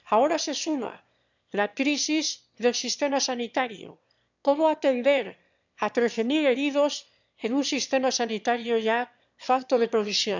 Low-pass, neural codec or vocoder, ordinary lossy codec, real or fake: 7.2 kHz; autoencoder, 22.05 kHz, a latent of 192 numbers a frame, VITS, trained on one speaker; none; fake